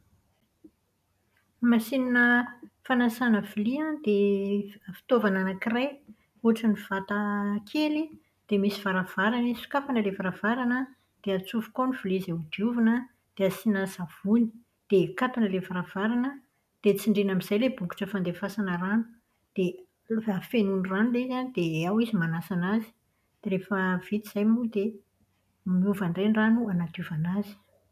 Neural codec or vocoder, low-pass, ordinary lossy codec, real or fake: none; 14.4 kHz; none; real